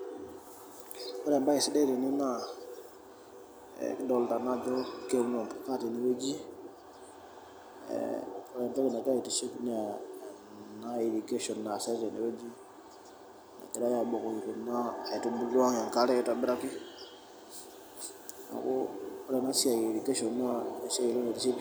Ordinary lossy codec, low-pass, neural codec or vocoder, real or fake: none; none; none; real